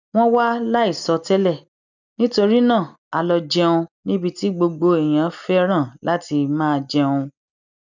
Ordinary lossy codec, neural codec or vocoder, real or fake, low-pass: none; none; real; 7.2 kHz